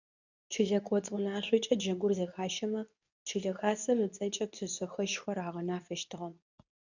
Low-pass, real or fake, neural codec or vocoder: 7.2 kHz; fake; codec, 24 kHz, 0.9 kbps, WavTokenizer, medium speech release version 2